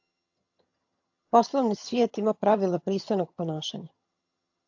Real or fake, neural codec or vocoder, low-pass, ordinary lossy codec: fake; vocoder, 22.05 kHz, 80 mel bands, HiFi-GAN; 7.2 kHz; AAC, 48 kbps